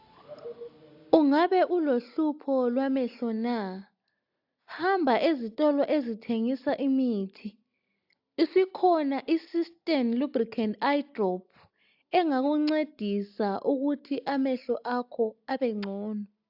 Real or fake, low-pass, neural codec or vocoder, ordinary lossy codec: real; 5.4 kHz; none; AAC, 48 kbps